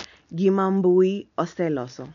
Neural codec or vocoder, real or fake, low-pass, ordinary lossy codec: none; real; 7.2 kHz; none